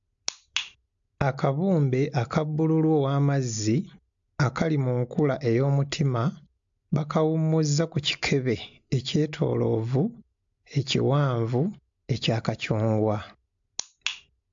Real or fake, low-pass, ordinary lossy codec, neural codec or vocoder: real; 7.2 kHz; AAC, 64 kbps; none